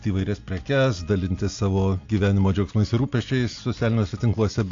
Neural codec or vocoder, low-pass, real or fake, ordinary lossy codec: none; 7.2 kHz; real; AAC, 48 kbps